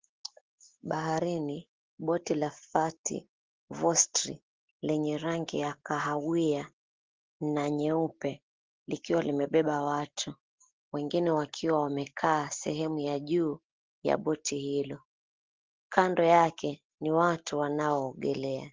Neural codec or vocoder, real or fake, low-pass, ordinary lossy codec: none; real; 7.2 kHz; Opus, 16 kbps